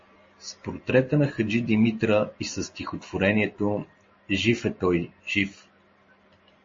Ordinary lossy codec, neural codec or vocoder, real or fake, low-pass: MP3, 32 kbps; none; real; 7.2 kHz